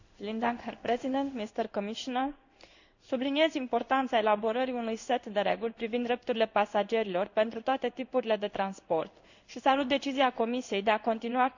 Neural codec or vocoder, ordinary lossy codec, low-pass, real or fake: codec, 16 kHz in and 24 kHz out, 1 kbps, XY-Tokenizer; none; 7.2 kHz; fake